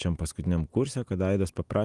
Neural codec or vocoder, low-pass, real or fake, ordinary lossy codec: none; 10.8 kHz; real; Opus, 24 kbps